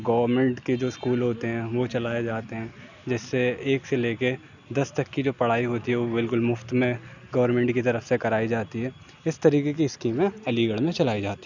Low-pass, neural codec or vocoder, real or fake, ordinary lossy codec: 7.2 kHz; none; real; none